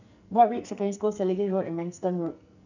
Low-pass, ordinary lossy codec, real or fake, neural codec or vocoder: 7.2 kHz; none; fake; codec, 44.1 kHz, 2.6 kbps, SNAC